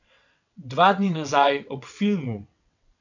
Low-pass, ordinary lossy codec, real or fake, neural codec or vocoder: 7.2 kHz; none; fake; vocoder, 22.05 kHz, 80 mel bands, WaveNeXt